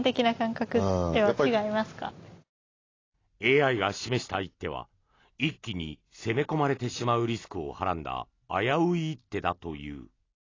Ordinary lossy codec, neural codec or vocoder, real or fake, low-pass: AAC, 32 kbps; none; real; 7.2 kHz